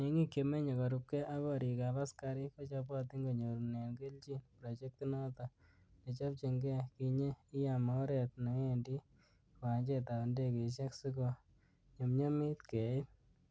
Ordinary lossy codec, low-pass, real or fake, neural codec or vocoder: none; none; real; none